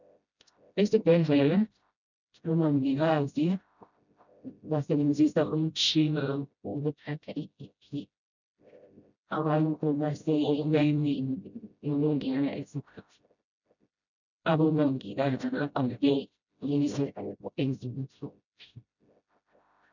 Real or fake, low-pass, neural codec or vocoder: fake; 7.2 kHz; codec, 16 kHz, 0.5 kbps, FreqCodec, smaller model